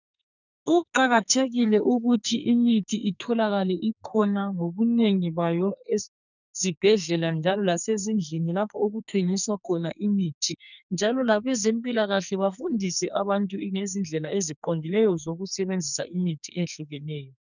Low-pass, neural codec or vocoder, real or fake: 7.2 kHz; codec, 44.1 kHz, 2.6 kbps, SNAC; fake